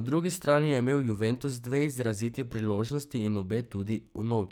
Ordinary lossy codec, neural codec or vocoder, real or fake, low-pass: none; codec, 44.1 kHz, 2.6 kbps, SNAC; fake; none